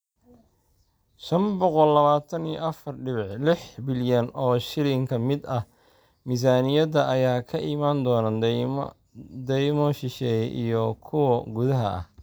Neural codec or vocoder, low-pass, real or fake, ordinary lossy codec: none; none; real; none